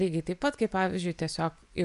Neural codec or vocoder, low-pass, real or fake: vocoder, 24 kHz, 100 mel bands, Vocos; 10.8 kHz; fake